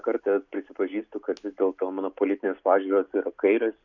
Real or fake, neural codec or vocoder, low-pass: real; none; 7.2 kHz